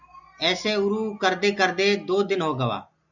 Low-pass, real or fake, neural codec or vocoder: 7.2 kHz; real; none